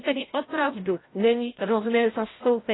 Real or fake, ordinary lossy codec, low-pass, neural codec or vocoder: fake; AAC, 16 kbps; 7.2 kHz; codec, 16 kHz, 0.5 kbps, FreqCodec, larger model